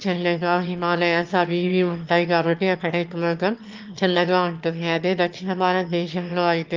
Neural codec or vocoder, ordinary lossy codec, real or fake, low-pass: autoencoder, 22.05 kHz, a latent of 192 numbers a frame, VITS, trained on one speaker; Opus, 32 kbps; fake; 7.2 kHz